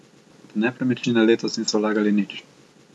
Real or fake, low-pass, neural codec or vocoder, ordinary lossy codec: real; none; none; none